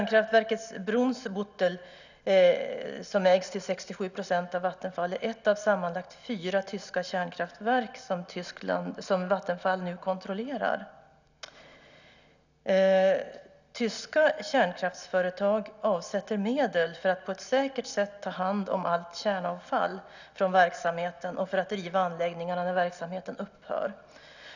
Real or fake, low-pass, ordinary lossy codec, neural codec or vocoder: real; 7.2 kHz; none; none